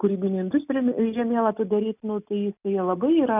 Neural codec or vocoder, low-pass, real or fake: none; 3.6 kHz; real